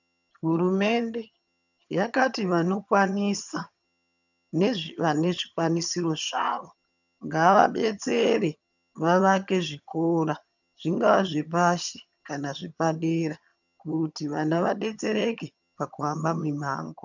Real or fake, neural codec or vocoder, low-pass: fake; vocoder, 22.05 kHz, 80 mel bands, HiFi-GAN; 7.2 kHz